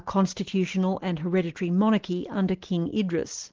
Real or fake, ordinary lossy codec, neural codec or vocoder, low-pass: real; Opus, 16 kbps; none; 7.2 kHz